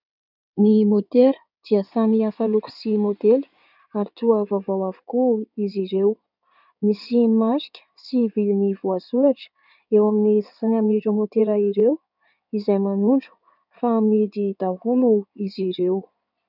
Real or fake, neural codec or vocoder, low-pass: fake; codec, 16 kHz in and 24 kHz out, 2.2 kbps, FireRedTTS-2 codec; 5.4 kHz